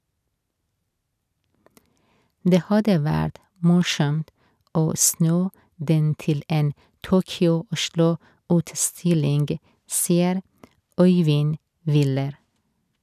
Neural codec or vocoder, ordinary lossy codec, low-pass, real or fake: none; none; 14.4 kHz; real